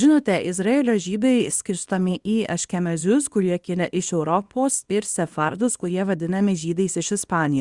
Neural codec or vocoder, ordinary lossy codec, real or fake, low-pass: codec, 24 kHz, 0.9 kbps, WavTokenizer, small release; Opus, 64 kbps; fake; 10.8 kHz